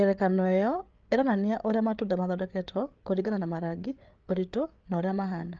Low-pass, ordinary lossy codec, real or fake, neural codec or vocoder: 7.2 kHz; Opus, 24 kbps; fake; codec, 16 kHz, 8 kbps, FreqCodec, larger model